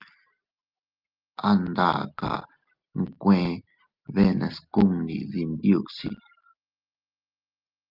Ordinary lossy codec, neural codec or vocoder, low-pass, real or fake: Opus, 24 kbps; none; 5.4 kHz; real